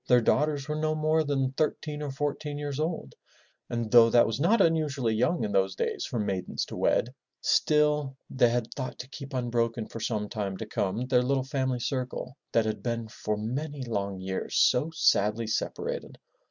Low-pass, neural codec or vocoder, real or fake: 7.2 kHz; none; real